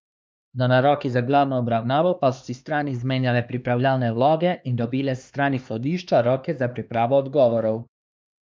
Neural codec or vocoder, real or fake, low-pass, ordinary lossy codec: codec, 16 kHz, 2 kbps, X-Codec, HuBERT features, trained on LibriSpeech; fake; none; none